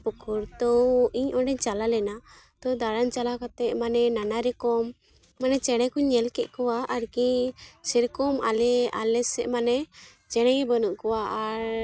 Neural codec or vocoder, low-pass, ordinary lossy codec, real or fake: none; none; none; real